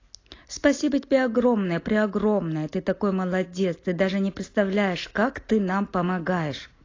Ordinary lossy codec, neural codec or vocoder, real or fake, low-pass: AAC, 32 kbps; none; real; 7.2 kHz